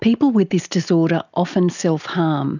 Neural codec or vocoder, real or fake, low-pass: none; real; 7.2 kHz